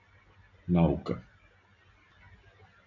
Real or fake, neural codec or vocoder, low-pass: real; none; 7.2 kHz